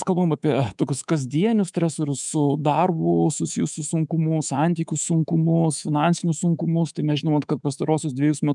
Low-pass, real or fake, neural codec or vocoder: 10.8 kHz; fake; codec, 24 kHz, 3.1 kbps, DualCodec